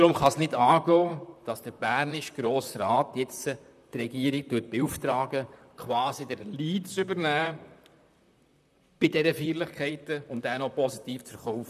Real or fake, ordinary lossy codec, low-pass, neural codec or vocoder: fake; none; 14.4 kHz; vocoder, 44.1 kHz, 128 mel bands, Pupu-Vocoder